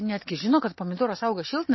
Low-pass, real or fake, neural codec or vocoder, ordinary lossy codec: 7.2 kHz; real; none; MP3, 24 kbps